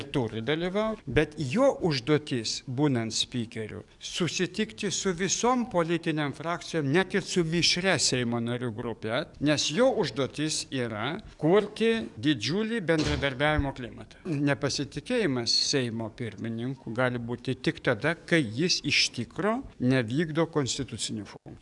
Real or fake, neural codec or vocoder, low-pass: fake; codec, 44.1 kHz, 7.8 kbps, DAC; 10.8 kHz